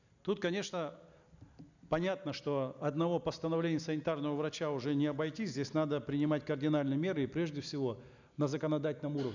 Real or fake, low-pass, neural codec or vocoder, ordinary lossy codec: real; 7.2 kHz; none; none